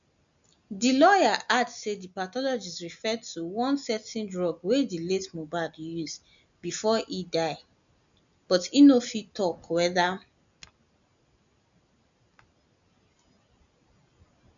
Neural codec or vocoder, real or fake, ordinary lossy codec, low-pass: none; real; none; 7.2 kHz